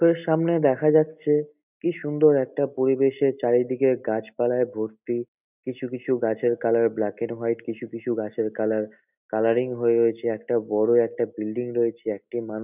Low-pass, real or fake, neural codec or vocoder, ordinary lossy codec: 3.6 kHz; real; none; none